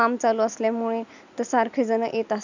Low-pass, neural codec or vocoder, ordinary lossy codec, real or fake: 7.2 kHz; none; none; real